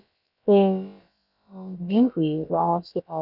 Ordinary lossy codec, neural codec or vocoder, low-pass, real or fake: none; codec, 16 kHz, about 1 kbps, DyCAST, with the encoder's durations; 5.4 kHz; fake